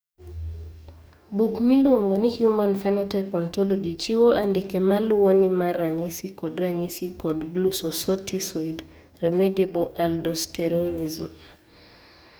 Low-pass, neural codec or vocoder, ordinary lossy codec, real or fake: none; codec, 44.1 kHz, 2.6 kbps, DAC; none; fake